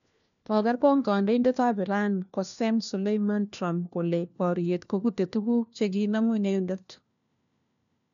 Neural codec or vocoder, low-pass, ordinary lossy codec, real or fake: codec, 16 kHz, 1 kbps, FunCodec, trained on LibriTTS, 50 frames a second; 7.2 kHz; none; fake